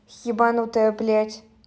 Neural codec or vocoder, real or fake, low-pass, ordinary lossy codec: none; real; none; none